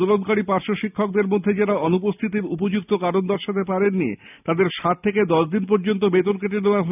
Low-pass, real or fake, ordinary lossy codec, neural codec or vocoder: 3.6 kHz; real; none; none